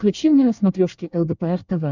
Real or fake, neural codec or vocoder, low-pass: fake; codec, 24 kHz, 1 kbps, SNAC; 7.2 kHz